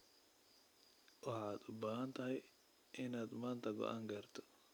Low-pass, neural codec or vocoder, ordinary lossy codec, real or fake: none; none; none; real